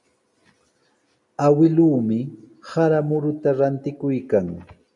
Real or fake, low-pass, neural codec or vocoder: real; 10.8 kHz; none